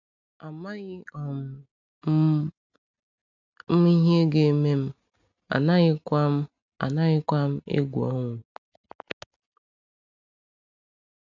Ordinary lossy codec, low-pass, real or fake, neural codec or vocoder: none; 7.2 kHz; real; none